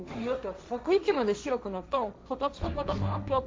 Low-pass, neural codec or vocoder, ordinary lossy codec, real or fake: none; codec, 16 kHz, 1.1 kbps, Voila-Tokenizer; none; fake